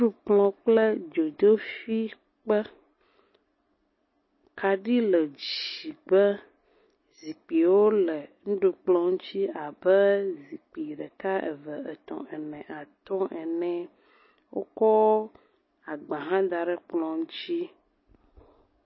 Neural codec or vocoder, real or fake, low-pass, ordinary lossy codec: none; real; 7.2 kHz; MP3, 24 kbps